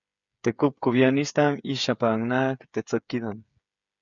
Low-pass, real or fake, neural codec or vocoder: 7.2 kHz; fake; codec, 16 kHz, 8 kbps, FreqCodec, smaller model